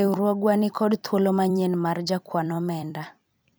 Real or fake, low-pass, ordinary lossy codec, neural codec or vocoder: fake; none; none; vocoder, 44.1 kHz, 128 mel bands every 256 samples, BigVGAN v2